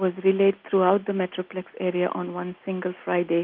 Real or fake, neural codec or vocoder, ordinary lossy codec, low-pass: real; none; Opus, 24 kbps; 5.4 kHz